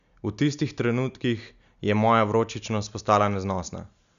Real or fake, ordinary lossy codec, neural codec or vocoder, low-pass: real; none; none; 7.2 kHz